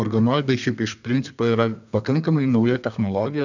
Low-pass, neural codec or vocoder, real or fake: 7.2 kHz; codec, 32 kHz, 1.9 kbps, SNAC; fake